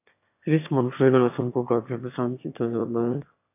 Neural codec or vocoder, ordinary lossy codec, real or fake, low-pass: autoencoder, 22.05 kHz, a latent of 192 numbers a frame, VITS, trained on one speaker; AAC, 32 kbps; fake; 3.6 kHz